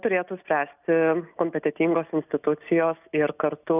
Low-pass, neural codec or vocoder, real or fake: 3.6 kHz; none; real